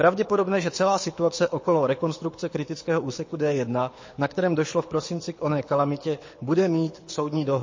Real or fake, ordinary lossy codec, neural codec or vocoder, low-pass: fake; MP3, 32 kbps; codec, 24 kHz, 6 kbps, HILCodec; 7.2 kHz